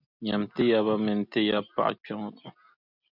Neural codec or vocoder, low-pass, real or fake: none; 5.4 kHz; real